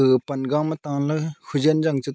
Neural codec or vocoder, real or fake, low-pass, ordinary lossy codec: none; real; none; none